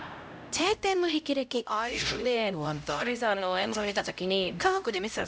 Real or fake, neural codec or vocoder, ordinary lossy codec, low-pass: fake; codec, 16 kHz, 0.5 kbps, X-Codec, HuBERT features, trained on LibriSpeech; none; none